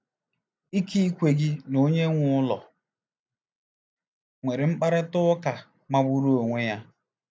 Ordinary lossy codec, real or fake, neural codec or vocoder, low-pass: none; real; none; none